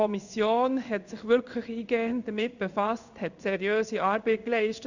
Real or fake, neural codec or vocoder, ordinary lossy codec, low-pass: fake; codec, 16 kHz in and 24 kHz out, 1 kbps, XY-Tokenizer; none; 7.2 kHz